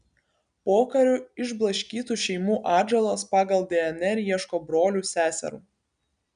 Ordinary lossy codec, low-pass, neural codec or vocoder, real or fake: MP3, 96 kbps; 9.9 kHz; none; real